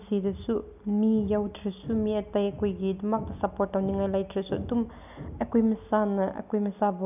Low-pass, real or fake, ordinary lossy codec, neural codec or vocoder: 3.6 kHz; real; none; none